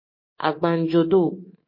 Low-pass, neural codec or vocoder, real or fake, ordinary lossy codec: 5.4 kHz; codec, 24 kHz, 3.1 kbps, DualCodec; fake; MP3, 24 kbps